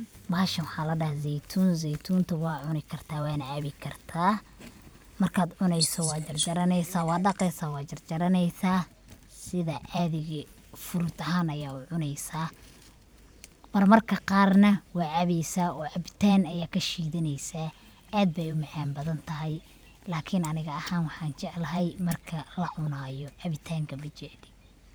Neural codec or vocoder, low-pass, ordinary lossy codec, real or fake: vocoder, 44.1 kHz, 128 mel bands every 512 samples, BigVGAN v2; none; none; fake